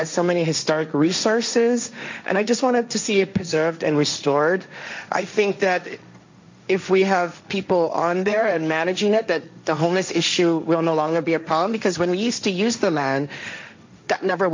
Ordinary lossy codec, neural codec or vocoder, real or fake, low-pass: MP3, 64 kbps; codec, 16 kHz, 1.1 kbps, Voila-Tokenizer; fake; 7.2 kHz